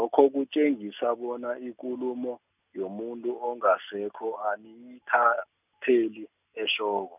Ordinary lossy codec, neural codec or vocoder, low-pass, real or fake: none; none; 3.6 kHz; real